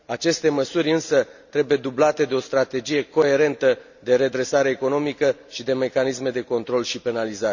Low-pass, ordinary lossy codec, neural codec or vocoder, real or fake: 7.2 kHz; none; none; real